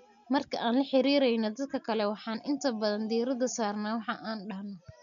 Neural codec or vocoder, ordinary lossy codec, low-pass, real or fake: none; none; 7.2 kHz; real